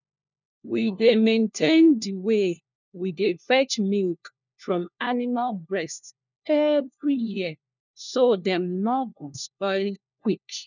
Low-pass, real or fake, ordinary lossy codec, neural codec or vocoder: 7.2 kHz; fake; none; codec, 16 kHz, 1 kbps, FunCodec, trained on LibriTTS, 50 frames a second